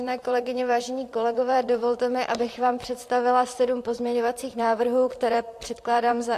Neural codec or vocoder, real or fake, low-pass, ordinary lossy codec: vocoder, 44.1 kHz, 128 mel bands, Pupu-Vocoder; fake; 14.4 kHz; AAC, 64 kbps